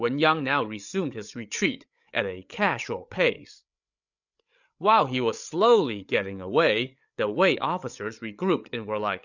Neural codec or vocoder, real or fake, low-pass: codec, 16 kHz, 8 kbps, FreqCodec, larger model; fake; 7.2 kHz